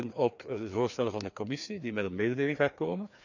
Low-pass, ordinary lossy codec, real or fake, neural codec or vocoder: 7.2 kHz; none; fake; codec, 16 kHz, 2 kbps, FreqCodec, larger model